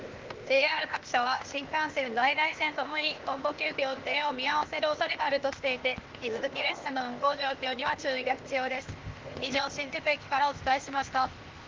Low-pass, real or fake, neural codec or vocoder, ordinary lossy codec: 7.2 kHz; fake; codec, 16 kHz, 0.8 kbps, ZipCodec; Opus, 32 kbps